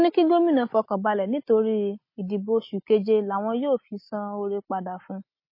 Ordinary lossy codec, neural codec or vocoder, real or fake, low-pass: MP3, 24 kbps; none; real; 5.4 kHz